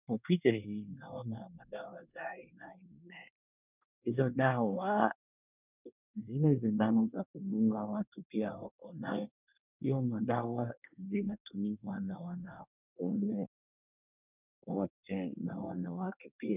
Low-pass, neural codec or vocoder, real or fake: 3.6 kHz; codec, 24 kHz, 1 kbps, SNAC; fake